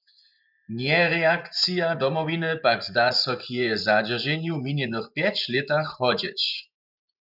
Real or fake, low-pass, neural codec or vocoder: fake; 5.4 kHz; vocoder, 24 kHz, 100 mel bands, Vocos